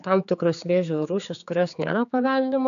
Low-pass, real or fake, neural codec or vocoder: 7.2 kHz; fake; codec, 16 kHz, 4 kbps, X-Codec, HuBERT features, trained on general audio